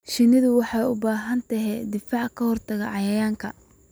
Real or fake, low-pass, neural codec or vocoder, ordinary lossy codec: real; none; none; none